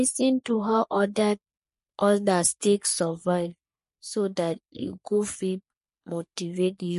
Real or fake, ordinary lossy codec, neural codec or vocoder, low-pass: fake; MP3, 48 kbps; codec, 44.1 kHz, 3.4 kbps, Pupu-Codec; 14.4 kHz